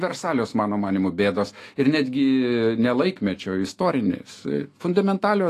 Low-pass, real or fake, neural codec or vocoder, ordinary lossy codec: 14.4 kHz; fake; autoencoder, 48 kHz, 128 numbers a frame, DAC-VAE, trained on Japanese speech; AAC, 48 kbps